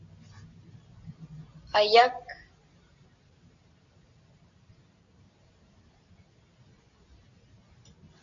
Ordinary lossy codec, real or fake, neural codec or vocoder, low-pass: MP3, 64 kbps; real; none; 7.2 kHz